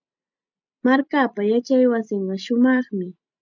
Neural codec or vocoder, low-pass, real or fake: none; 7.2 kHz; real